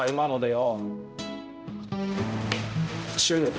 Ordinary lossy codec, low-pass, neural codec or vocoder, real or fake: none; none; codec, 16 kHz, 0.5 kbps, X-Codec, HuBERT features, trained on balanced general audio; fake